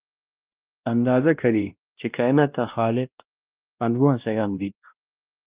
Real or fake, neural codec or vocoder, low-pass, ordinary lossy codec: fake; codec, 16 kHz, 1 kbps, X-Codec, WavLM features, trained on Multilingual LibriSpeech; 3.6 kHz; Opus, 32 kbps